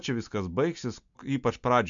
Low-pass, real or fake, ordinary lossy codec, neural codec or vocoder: 7.2 kHz; real; MP3, 64 kbps; none